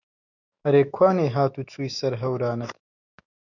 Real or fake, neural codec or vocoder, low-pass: fake; autoencoder, 48 kHz, 128 numbers a frame, DAC-VAE, trained on Japanese speech; 7.2 kHz